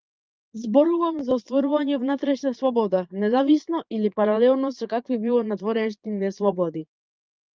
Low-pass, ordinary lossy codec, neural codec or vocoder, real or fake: 7.2 kHz; Opus, 24 kbps; vocoder, 22.05 kHz, 80 mel bands, Vocos; fake